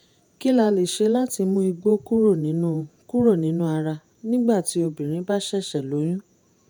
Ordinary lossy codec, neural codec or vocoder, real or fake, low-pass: none; vocoder, 48 kHz, 128 mel bands, Vocos; fake; none